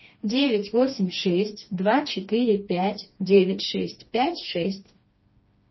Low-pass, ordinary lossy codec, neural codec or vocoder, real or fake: 7.2 kHz; MP3, 24 kbps; codec, 16 kHz, 2 kbps, FreqCodec, smaller model; fake